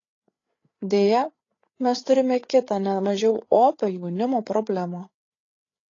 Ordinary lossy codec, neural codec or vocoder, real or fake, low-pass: AAC, 32 kbps; codec, 16 kHz, 8 kbps, FreqCodec, larger model; fake; 7.2 kHz